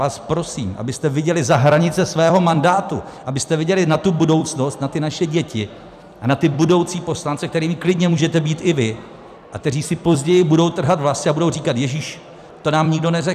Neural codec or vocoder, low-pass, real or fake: vocoder, 44.1 kHz, 128 mel bands every 256 samples, BigVGAN v2; 14.4 kHz; fake